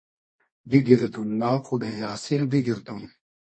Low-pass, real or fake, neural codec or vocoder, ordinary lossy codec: 9.9 kHz; fake; codec, 24 kHz, 0.9 kbps, WavTokenizer, medium music audio release; MP3, 32 kbps